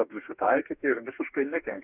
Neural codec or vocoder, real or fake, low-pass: codec, 44.1 kHz, 2.6 kbps, DAC; fake; 3.6 kHz